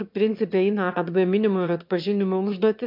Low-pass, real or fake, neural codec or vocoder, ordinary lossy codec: 5.4 kHz; fake; autoencoder, 22.05 kHz, a latent of 192 numbers a frame, VITS, trained on one speaker; MP3, 48 kbps